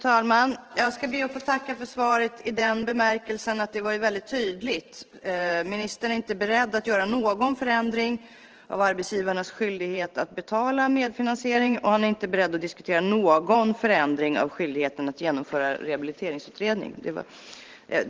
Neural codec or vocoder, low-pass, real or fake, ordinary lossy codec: vocoder, 44.1 kHz, 128 mel bands every 512 samples, BigVGAN v2; 7.2 kHz; fake; Opus, 16 kbps